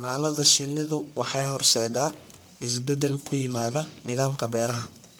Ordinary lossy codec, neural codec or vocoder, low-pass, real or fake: none; codec, 44.1 kHz, 1.7 kbps, Pupu-Codec; none; fake